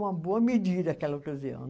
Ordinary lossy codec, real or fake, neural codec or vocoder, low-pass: none; real; none; none